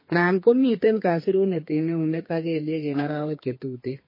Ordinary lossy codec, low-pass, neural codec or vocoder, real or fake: MP3, 24 kbps; 5.4 kHz; codec, 32 kHz, 1.9 kbps, SNAC; fake